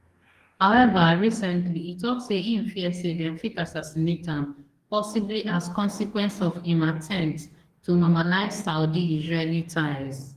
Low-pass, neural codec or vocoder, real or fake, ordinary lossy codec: 14.4 kHz; codec, 44.1 kHz, 2.6 kbps, DAC; fake; Opus, 24 kbps